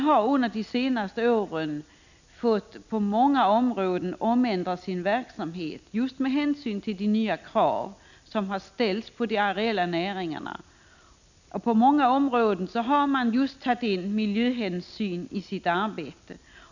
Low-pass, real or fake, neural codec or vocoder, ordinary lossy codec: 7.2 kHz; real; none; none